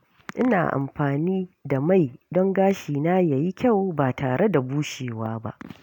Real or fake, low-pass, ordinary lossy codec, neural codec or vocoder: real; 19.8 kHz; none; none